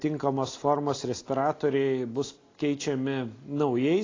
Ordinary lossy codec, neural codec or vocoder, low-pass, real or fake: AAC, 32 kbps; none; 7.2 kHz; real